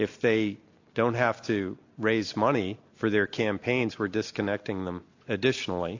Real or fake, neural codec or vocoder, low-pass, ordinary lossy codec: real; none; 7.2 kHz; AAC, 48 kbps